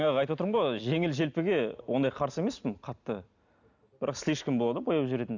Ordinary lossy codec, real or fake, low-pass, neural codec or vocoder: none; real; 7.2 kHz; none